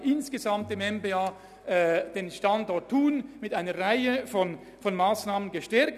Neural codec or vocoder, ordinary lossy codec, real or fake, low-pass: none; none; real; 14.4 kHz